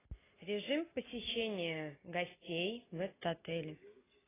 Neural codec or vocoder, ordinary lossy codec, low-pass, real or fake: none; AAC, 16 kbps; 3.6 kHz; real